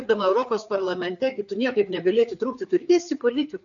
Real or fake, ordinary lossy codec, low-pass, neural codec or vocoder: fake; MP3, 96 kbps; 7.2 kHz; codec, 16 kHz, 4 kbps, FreqCodec, larger model